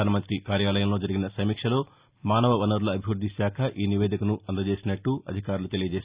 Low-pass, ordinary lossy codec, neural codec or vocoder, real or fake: 3.6 kHz; Opus, 64 kbps; none; real